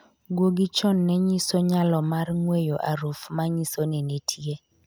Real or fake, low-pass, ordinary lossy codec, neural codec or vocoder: real; none; none; none